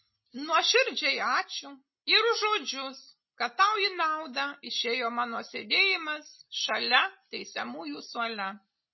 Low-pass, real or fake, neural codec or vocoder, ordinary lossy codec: 7.2 kHz; real; none; MP3, 24 kbps